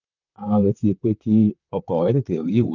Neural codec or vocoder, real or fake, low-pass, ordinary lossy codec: vocoder, 44.1 kHz, 128 mel bands, Pupu-Vocoder; fake; 7.2 kHz; none